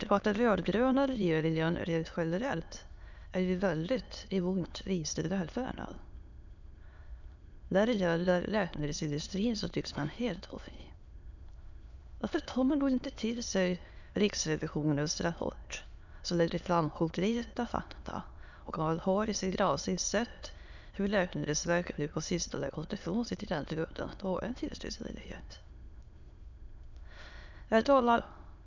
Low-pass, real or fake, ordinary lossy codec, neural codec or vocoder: 7.2 kHz; fake; none; autoencoder, 22.05 kHz, a latent of 192 numbers a frame, VITS, trained on many speakers